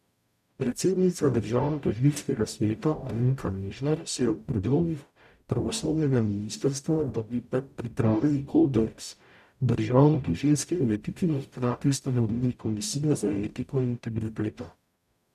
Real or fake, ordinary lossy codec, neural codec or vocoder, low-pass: fake; none; codec, 44.1 kHz, 0.9 kbps, DAC; 14.4 kHz